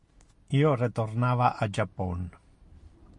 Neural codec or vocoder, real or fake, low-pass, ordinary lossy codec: none; real; 10.8 kHz; MP3, 48 kbps